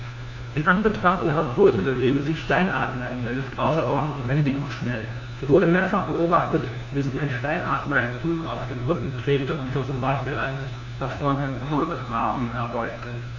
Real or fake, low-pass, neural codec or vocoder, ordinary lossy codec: fake; 7.2 kHz; codec, 16 kHz, 1 kbps, FunCodec, trained on LibriTTS, 50 frames a second; AAC, 48 kbps